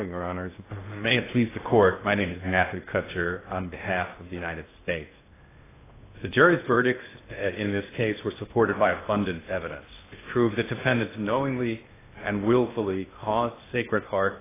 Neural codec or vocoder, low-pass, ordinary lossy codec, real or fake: codec, 16 kHz in and 24 kHz out, 0.6 kbps, FocalCodec, streaming, 2048 codes; 3.6 kHz; AAC, 16 kbps; fake